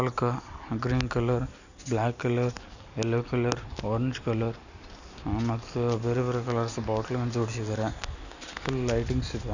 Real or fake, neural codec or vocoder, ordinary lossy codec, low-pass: real; none; none; 7.2 kHz